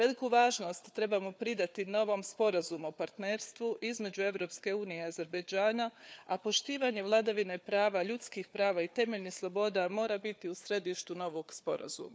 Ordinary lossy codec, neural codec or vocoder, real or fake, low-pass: none; codec, 16 kHz, 4 kbps, FunCodec, trained on Chinese and English, 50 frames a second; fake; none